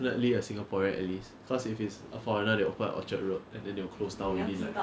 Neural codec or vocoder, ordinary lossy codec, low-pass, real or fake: none; none; none; real